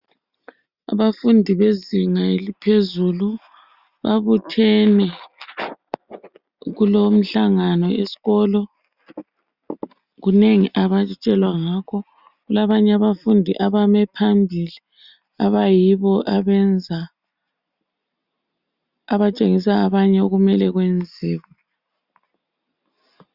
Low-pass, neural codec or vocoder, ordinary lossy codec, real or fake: 5.4 kHz; none; Opus, 64 kbps; real